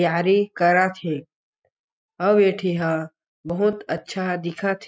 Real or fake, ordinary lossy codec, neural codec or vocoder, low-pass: real; none; none; none